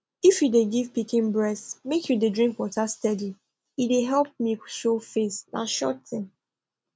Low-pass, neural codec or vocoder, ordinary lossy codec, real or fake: none; none; none; real